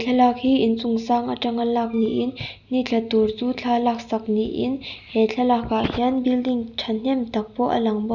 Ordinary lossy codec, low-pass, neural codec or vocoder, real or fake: Opus, 64 kbps; 7.2 kHz; none; real